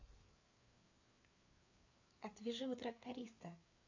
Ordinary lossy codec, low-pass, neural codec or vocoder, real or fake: MP3, 48 kbps; 7.2 kHz; codec, 16 kHz, 4 kbps, FreqCodec, larger model; fake